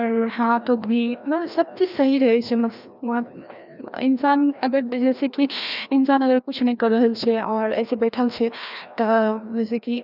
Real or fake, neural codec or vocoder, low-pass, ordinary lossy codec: fake; codec, 16 kHz, 1 kbps, FreqCodec, larger model; 5.4 kHz; none